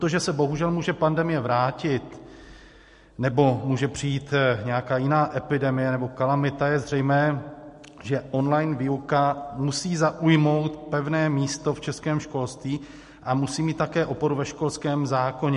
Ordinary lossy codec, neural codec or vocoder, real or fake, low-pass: MP3, 48 kbps; none; real; 14.4 kHz